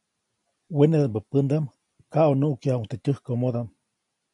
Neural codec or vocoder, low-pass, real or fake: none; 10.8 kHz; real